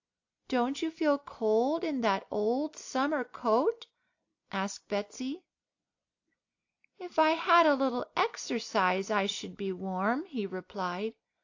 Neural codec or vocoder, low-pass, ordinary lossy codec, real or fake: none; 7.2 kHz; AAC, 48 kbps; real